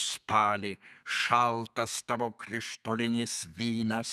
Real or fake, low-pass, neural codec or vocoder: fake; 14.4 kHz; codec, 32 kHz, 1.9 kbps, SNAC